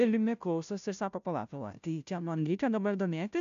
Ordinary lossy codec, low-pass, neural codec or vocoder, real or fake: MP3, 64 kbps; 7.2 kHz; codec, 16 kHz, 0.5 kbps, FunCodec, trained on Chinese and English, 25 frames a second; fake